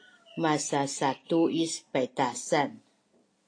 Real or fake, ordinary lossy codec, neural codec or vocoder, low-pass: real; AAC, 48 kbps; none; 9.9 kHz